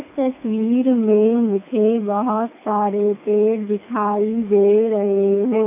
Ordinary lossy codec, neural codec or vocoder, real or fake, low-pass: none; codec, 24 kHz, 3 kbps, HILCodec; fake; 3.6 kHz